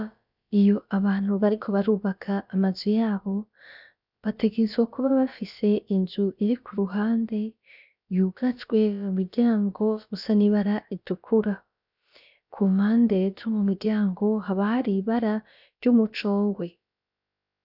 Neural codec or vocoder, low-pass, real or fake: codec, 16 kHz, about 1 kbps, DyCAST, with the encoder's durations; 5.4 kHz; fake